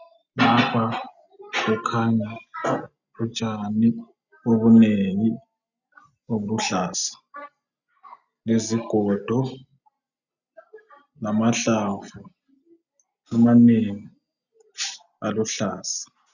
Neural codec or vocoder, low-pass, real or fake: none; 7.2 kHz; real